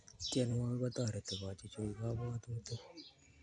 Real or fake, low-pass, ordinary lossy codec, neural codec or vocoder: real; 9.9 kHz; AAC, 64 kbps; none